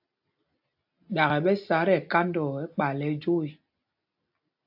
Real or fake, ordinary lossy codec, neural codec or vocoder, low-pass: real; AAC, 48 kbps; none; 5.4 kHz